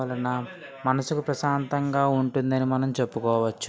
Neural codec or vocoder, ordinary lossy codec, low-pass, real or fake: none; none; none; real